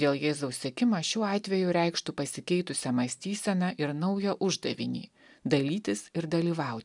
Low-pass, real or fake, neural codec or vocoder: 10.8 kHz; real; none